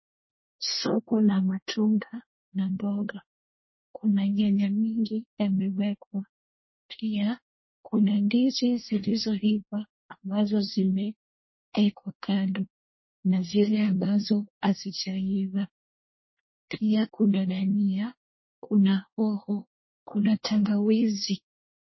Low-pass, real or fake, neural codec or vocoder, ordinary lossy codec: 7.2 kHz; fake; codec, 24 kHz, 1 kbps, SNAC; MP3, 24 kbps